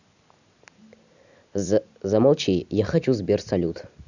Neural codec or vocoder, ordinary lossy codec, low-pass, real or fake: none; none; 7.2 kHz; real